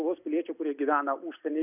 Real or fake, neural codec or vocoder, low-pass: real; none; 3.6 kHz